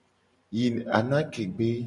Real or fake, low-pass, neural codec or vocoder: fake; 10.8 kHz; vocoder, 44.1 kHz, 128 mel bands every 512 samples, BigVGAN v2